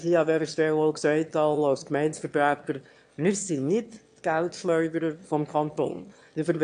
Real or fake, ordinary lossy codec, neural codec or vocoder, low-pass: fake; AAC, 64 kbps; autoencoder, 22.05 kHz, a latent of 192 numbers a frame, VITS, trained on one speaker; 9.9 kHz